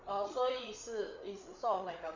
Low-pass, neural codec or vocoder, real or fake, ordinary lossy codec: 7.2 kHz; codec, 16 kHz, 8 kbps, FreqCodec, larger model; fake; Opus, 64 kbps